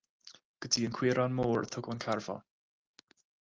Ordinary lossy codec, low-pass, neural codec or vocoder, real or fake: Opus, 32 kbps; 7.2 kHz; none; real